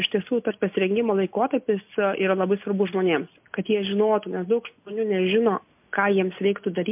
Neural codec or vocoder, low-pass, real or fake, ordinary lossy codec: none; 3.6 kHz; real; MP3, 32 kbps